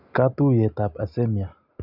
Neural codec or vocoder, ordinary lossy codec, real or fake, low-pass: none; none; real; 5.4 kHz